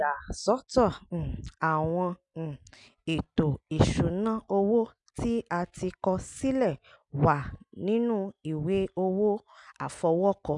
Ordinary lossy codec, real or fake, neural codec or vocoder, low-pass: MP3, 96 kbps; real; none; 10.8 kHz